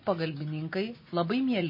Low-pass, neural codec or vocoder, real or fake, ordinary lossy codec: 5.4 kHz; none; real; MP3, 24 kbps